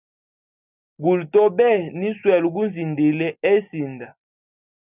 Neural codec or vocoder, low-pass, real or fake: none; 3.6 kHz; real